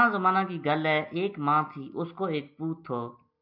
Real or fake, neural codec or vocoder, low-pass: real; none; 5.4 kHz